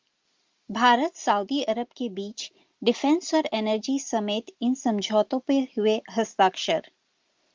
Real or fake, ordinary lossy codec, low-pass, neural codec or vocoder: real; Opus, 32 kbps; 7.2 kHz; none